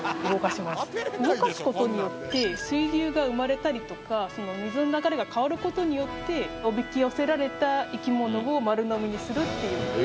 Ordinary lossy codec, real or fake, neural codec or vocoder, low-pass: none; real; none; none